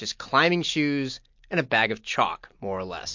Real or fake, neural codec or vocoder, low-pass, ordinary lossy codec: real; none; 7.2 kHz; MP3, 48 kbps